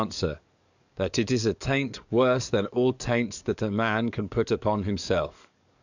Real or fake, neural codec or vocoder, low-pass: fake; codec, 44.1 kHz, 7.8 kbps, DAC; 7.2 kHz